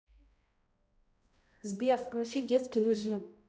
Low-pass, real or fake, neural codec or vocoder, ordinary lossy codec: none; fake; codec, 16 kHz, 0.5 kbps, X-Codec, HuBERT features, trained on balanced general audio; none